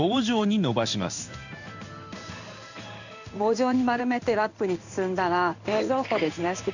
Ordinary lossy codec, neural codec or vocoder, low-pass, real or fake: none; codec, 16 kHz in and 24 kHz out, 1 kbps, XY-Tokenizer; 7.2 kHz; fake